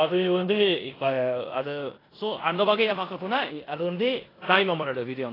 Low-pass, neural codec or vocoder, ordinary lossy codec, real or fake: 5.4 kHz; codec, 16 kHz in and 24 kHz out, 0.9 kbps, LongCat-Audio-Codec, four codebook decoder; AAC, 24 kbps; fake